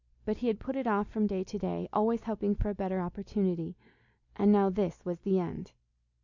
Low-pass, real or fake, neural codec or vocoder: 7.2 kHz; real; none